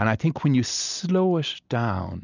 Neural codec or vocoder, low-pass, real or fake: none; 7.2 kHz; real